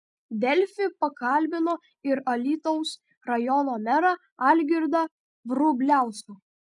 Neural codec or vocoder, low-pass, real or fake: none; 10.8 kHz; real